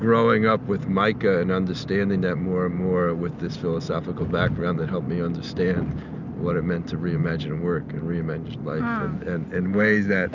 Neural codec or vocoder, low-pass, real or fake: none; 7.2 kHz; real